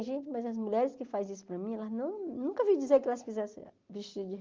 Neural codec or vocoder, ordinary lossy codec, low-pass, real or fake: none; Opus, 32 kbps; 7.2 kHz; real